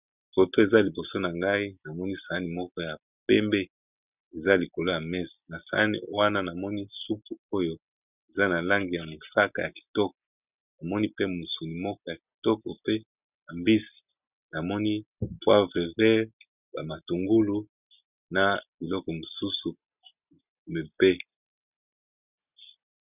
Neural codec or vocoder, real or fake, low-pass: none; real; 3.6 kHz